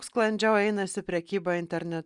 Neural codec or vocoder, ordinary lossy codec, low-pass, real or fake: none; Opus, 64 kbps; 10.8 kHz; real